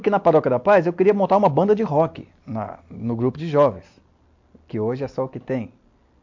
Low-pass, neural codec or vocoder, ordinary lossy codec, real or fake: 7.2 kHz; none; MP3, 48 kbps; real